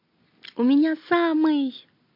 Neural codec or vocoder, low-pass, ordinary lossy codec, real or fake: none; 5.4 kHz; MP3, 32 kbps; real